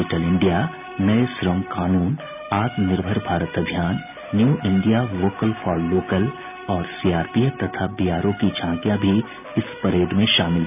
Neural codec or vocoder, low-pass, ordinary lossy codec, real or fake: none; 3.6 kHz; none; real